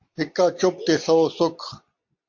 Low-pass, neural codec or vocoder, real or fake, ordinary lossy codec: 7.2 kHz; none; real; MP3, 48 kbps